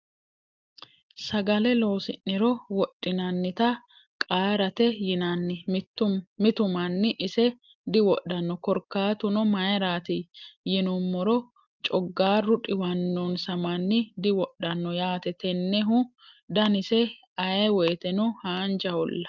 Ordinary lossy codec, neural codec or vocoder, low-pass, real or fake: Opus, 32 kbps; none; 7.2 kHz; real